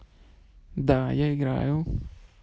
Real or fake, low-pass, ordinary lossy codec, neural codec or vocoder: real; none; none; none